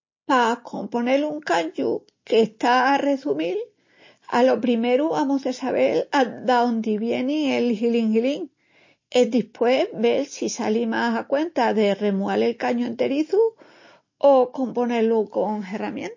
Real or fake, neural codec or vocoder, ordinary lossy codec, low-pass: real; none; MP3, 32 kbps; 7.2 kHz